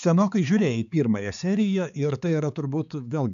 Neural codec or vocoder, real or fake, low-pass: codec, 16 kHz, 4 kbps, X-Codec, HuBERT features, trained on balanced general audio; fake; 7.2 kHz